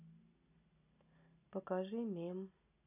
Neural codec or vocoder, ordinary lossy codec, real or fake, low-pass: none; none; real; 3.6 kHz